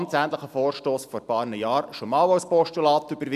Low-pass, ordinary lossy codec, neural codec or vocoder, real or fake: 14.4 kHz; AAC, 96 kbps; none; real